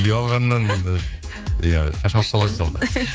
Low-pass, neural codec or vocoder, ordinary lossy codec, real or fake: none; codec, 16 kHz, 4 kbps, X-Codec, HuBERT features, trained on general audio; none; fake